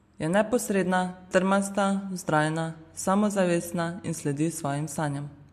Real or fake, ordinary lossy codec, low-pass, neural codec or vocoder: real; MP3, 64 kbps; 14.4 kHz; none